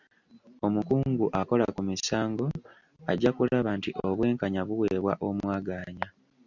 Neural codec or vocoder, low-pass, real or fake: none; 7.2 kHz; real